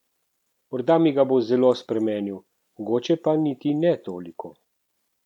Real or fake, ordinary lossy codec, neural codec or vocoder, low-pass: real; none; none; 19.8 kHz